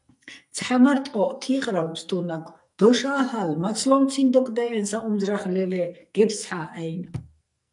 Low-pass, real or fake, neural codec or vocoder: 10.8 kHz; fake; codec, 44.1 kHz, 2.6 kbps, SNAC